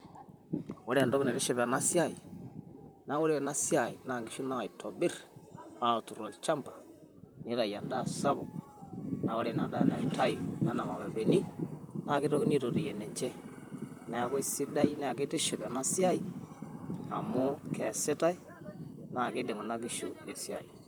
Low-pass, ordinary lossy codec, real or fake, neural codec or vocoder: none; none; fake; vocoder, 44.1 kHz, 128 mel bands, Pupu-Vocoder